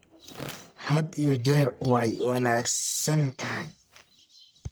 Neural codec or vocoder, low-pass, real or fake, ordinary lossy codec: codec, 44.1 kHz, 1.7 kbps, Pupu-Codec; none; fake; none